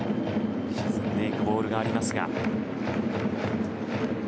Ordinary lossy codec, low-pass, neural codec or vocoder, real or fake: none; none; none; real